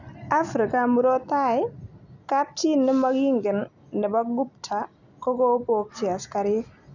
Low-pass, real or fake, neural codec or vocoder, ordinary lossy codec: 7.2 kHz; real; none; none